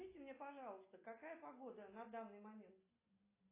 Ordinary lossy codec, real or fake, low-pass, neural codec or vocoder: AAC, 16 kbps; real; 3.6 kHz; none